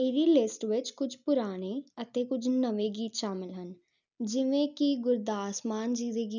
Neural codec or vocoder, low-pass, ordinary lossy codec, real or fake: none; 7.2 kHz; none; real